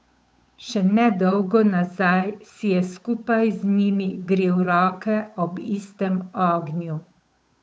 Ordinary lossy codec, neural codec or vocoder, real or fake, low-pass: none; codec, 16 kHz, 8 kbps, FunCodec, trained on Chinese and English, 25 frames a second; fake; none